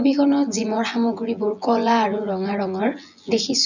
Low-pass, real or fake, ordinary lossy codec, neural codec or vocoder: 7.2 kHz; fake; none; vocoder, 24 kHz, 100 mel bands, Vocos